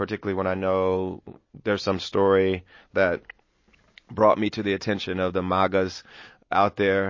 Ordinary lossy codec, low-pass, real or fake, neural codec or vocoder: MP3, 32 kbps; 7.2 kHz; real; none